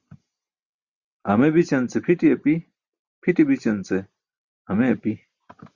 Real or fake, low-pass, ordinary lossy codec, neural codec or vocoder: real; 7.2 kHz; Opus, 64 kbps; none